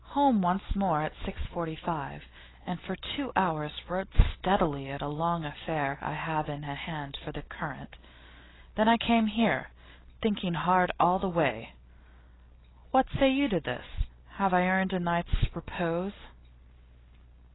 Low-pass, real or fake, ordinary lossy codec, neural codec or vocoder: 7.2 kHz; real; AAC, 16 kbps; none